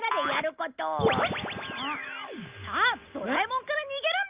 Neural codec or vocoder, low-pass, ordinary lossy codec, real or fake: none; 3.6 kHz; Opus, 24 kbps; real